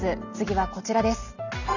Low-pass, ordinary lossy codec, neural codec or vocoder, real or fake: 7.2 kHz; none; none; real